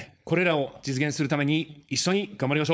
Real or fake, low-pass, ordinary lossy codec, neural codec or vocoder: fake; none; none; codec, 16 kHz, 4.8 kbps, FACodec